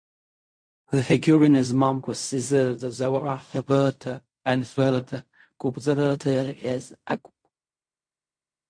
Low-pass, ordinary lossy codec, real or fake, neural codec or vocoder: 9.9 kHz; MP3, 48 kbps; fake; codec, 16 kHz in and 24 kHz out, 0.4 kbps, LongCat-Audio-Codec, fine tuned four codebook decoder